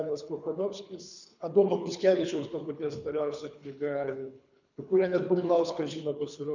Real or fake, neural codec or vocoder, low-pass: fake; codec, 24 kHz, 3 kbps, HILCodec; 7.2 kHz